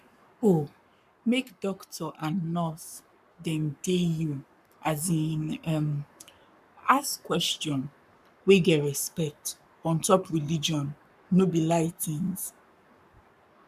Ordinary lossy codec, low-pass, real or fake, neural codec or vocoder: none; 14.4 kHz; fake; codec, 44.1 kHz, 7.8 kbps, Pupu-Codec